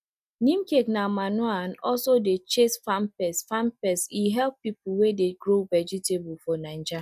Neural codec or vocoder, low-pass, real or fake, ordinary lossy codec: none; 14.4 kHz; real; none